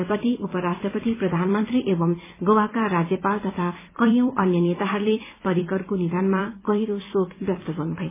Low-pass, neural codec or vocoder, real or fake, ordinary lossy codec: 3.6 kHz; vocoder, 44.1 kHz, 128 mel bands every 256 samples, BigVGAN v2; fake; MP3, 16 kbps